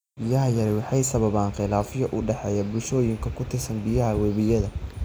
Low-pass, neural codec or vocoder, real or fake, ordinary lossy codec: none; none; real; none